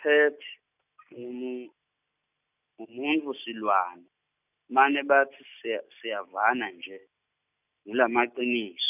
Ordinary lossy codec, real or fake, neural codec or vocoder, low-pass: none; real; none; 3.6 kHz